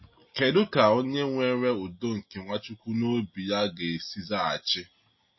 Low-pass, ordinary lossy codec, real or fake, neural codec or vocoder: 7.2 kHz; MP3, 24 kbps; real; none